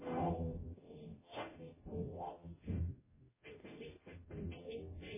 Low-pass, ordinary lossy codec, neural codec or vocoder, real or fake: 3.6 kHz; MP3, 16 kbps; codec, 44.1 kHz, 0.9 kbps, DAC; fake